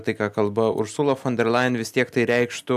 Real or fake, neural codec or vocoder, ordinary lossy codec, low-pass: real; none; AAC, 96 kbps; 14.4 kHz